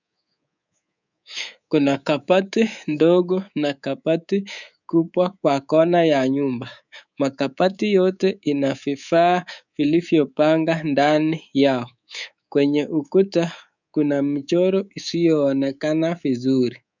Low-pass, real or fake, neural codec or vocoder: 7.2 kHz; fake; codec, 24 kHz, 3.1 kbps, DualCodec